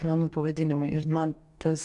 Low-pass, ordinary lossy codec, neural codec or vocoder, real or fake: 10.8 kHz; AAC, 64 kbps; codec, 44.1 kHz, 2.6 kbps, DAC; fake